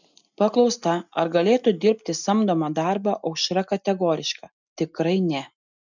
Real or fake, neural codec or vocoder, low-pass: real; none; 7.2 kHz